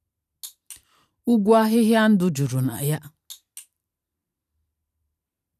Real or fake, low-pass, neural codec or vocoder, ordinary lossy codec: fake; 14.4 kHz; vocoder, 44.1 kHz, 128 mel bands every 512 samples, BigVGAN v2; none